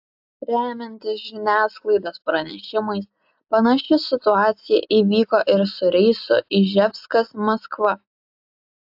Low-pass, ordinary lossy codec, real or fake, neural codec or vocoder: 5.4 kHz; AAC, 48 kbps; real; none